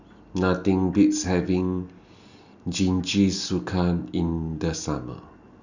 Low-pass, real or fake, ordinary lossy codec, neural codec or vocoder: 7.2 kHz; real; none; none